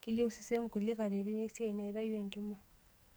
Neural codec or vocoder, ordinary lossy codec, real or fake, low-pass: codec, 44.1 kHz, 2.6 kbps, SNAC; none; fake; none